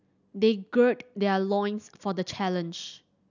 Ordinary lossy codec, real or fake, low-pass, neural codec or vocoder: none; real; 7.2 kHz; none